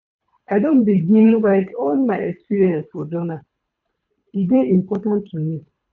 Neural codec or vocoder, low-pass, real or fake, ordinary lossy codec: codec, 24 kHz, 3 kbps, HILCodec; 7.2 kHz; fake; none